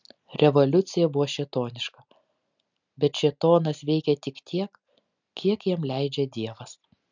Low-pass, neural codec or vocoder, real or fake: 7.2 kHz; none; real